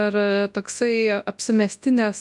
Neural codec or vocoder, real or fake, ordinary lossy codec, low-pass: codec, 24 kHz, 0.9 kbps, WavTokenizer, large speech release; fake; MP3, 96 kbps; 10.8 kHz